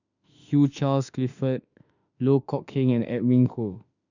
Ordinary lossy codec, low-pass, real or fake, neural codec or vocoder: none; 7.2 kHz; fake; autoencoder, 48 kHz, 32 numbers a frame, DAC-VAE, trained on Japanese speech